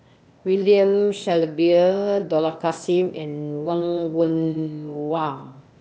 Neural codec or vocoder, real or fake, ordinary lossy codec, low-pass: codec, 16 kHz, 0.8 kbps, ZipCodec; fake; none; none